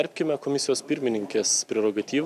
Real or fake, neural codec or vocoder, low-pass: real; none; 14.4 kHz